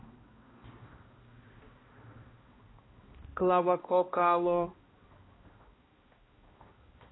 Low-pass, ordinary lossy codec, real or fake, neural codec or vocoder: 7.2 kHz; AAC, 16 kbps; fake; codec, 16 kHz, 1 kbps, X-Codec, HuBERT features, trained on balanced general audio